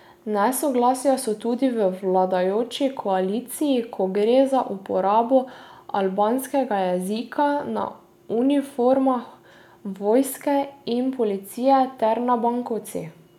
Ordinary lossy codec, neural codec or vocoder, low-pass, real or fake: none; none; 19.8 kHz; real